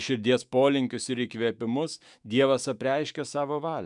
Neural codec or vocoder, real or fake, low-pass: autoencoder, 48 kHz, 128 numbers a frame, DAC-VAE, trained on Japanese speech; fake; 10.8 kHz